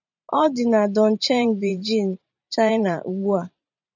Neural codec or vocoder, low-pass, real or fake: vocoder, 44.1 kHz, 128 mel bands every 512 samples, BigVGAN v2; 7.2 kHz; fake